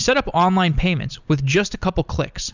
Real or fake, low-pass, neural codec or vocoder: real; 7.2 kHz; none